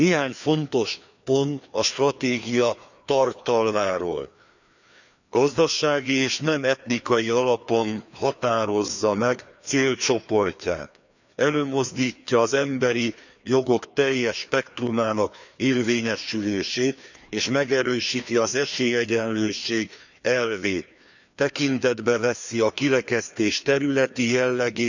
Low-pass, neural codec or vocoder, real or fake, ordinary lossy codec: 7.2 kHz; codec, 16 kHz, 2 kbps, FreqCodec, larger model; fake; none